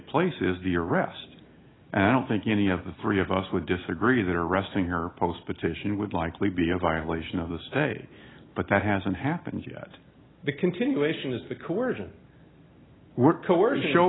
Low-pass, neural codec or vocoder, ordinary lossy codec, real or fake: 7.2 kHz; none; AAC, 16 kbps; real